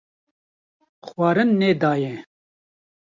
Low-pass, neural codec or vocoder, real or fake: 7.2 kHz; none; real